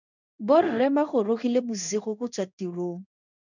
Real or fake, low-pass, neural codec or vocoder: fake; 7.2 kHz; codec, 16 kHz in and 24 kHz out, 1 kbps, XY-Tokenizer